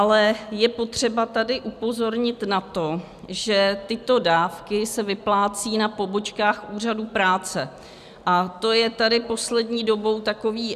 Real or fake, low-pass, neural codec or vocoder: real; 14.4 kHz; none